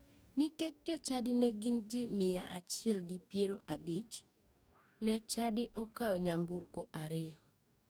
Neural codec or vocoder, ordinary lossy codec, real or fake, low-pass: codec, 44.1 kHz, 2.6 kbps, DAC; none; fake; none